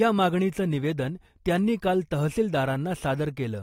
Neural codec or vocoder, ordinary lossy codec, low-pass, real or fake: none; AAC, 48 kbps; 19.8 kHz; real